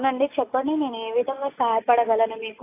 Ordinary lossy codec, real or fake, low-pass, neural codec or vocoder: AAC, 24 kbps; real; 3.6 kHz; none